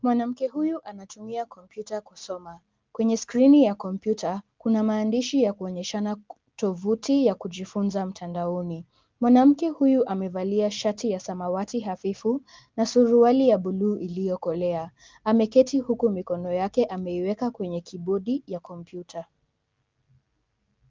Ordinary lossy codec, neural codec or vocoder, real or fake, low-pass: Opus, 32 kbps; none; real; 7.2 kHz